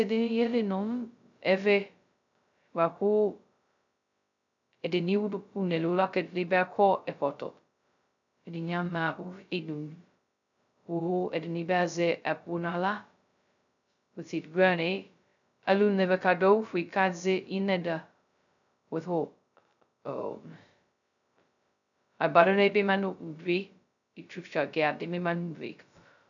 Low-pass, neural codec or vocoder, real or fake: 7.2 kHz; codec, 16 kHz, 0.2 kbps, FocalCodec; fake